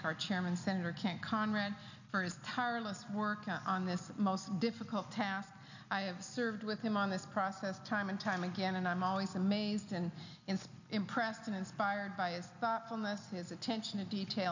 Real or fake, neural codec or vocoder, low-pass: real; none; 7.2 kHz